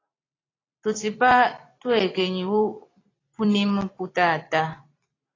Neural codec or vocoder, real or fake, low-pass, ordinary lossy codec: vocoder, 44.1 kHz, 128 mel bands every 256 samples, BigVGAN v2; fake; 7.2 kHz; AAC, 32 kbps